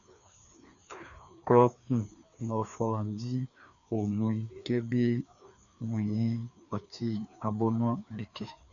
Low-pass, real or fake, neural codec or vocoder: 7.2 kHz; fake; codec, 16 kHz, 2 kbps, FreqCodec, larger model